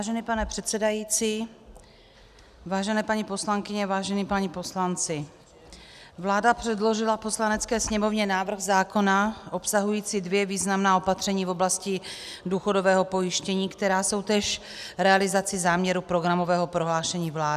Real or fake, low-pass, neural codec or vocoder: real; 14.4 kHz; none